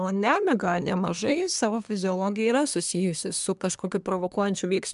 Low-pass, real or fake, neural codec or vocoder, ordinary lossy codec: 10.8 kHz; fake; codec, 24 kHz, 1 kbps, SNAC; MP3, 96 kbps